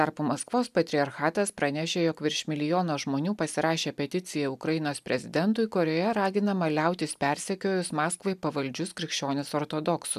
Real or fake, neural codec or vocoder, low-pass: real; none; 14.4 kHz